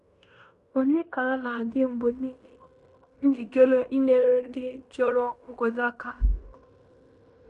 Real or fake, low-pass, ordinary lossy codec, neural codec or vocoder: fake; 10.8 kHz; none; codec, 16 kHz in and 24 kHz out, 0.9 kbps, LongCat-Audio-Codec, fine tuned four codebook decoder